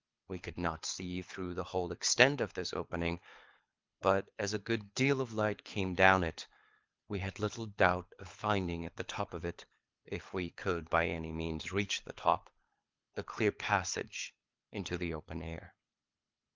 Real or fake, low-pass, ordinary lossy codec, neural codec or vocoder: fake; 7.2 kHz; Opus, 24 kbps; codec, 24 kHz, 6 kbps, HILCodec